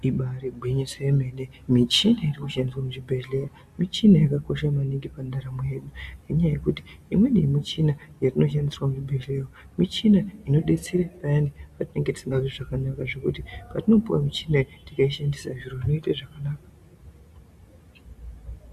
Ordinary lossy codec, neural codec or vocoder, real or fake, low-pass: Opus, 64 kbps; none; real; 14.4 kHz